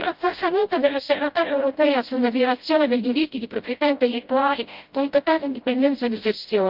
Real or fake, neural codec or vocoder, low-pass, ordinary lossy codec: fake; codec, 16 kHz, 0.5 kbps, FreqCodec, smaller model; 5.4 kHz; Opus, 32 kbps